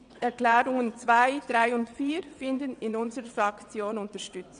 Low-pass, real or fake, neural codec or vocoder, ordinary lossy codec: 9.9 kHz; fake; vocoder, 22.05 kHz, 80 mel bands, WaveNeXt; none